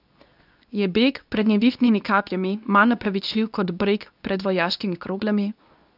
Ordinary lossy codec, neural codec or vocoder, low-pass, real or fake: none; codec, 24 kHz, 0.9 kbps, WavTokenizer, medium speech release version 2; 5.4 kHz; fake